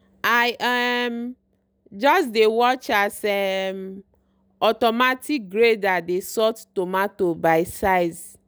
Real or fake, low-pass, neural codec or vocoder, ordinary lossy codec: real; none; none; none